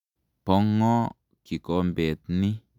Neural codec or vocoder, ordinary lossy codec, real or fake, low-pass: none; none; real; 19.8 kHz